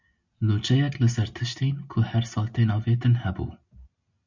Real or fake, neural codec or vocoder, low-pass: real; none; 7.2 kHz